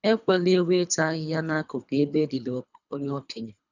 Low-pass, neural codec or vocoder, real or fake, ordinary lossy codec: 7.2 kHz; codec, 24 kHz, 3 kbps, HILCodec; fake; none